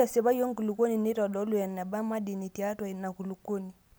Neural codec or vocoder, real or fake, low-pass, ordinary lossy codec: none; real; none; none